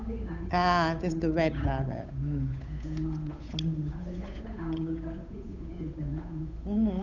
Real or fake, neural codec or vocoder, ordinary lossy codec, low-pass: fake; codec, 16 kHz, 8 kbps, FunCodec, trained on Chinese and English, 25 frames a second; none; 7.2 kHz